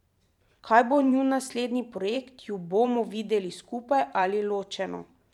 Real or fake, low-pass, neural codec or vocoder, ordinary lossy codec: fake; 19.8 kHz; vocoder, 44.1 kHz, 128 mel bands every 512 samples, BigVGAN v2; none